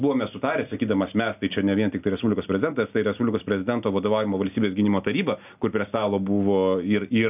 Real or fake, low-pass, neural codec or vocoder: real; 3.6 kHz; none